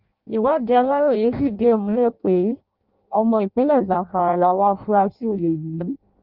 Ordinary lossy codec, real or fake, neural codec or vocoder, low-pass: Opus, 24 kbps; fake; codec, 16 kHz in and 24 kHz out, 0.6 kbps, FireRedTTS-2 codec; 5.4 kHz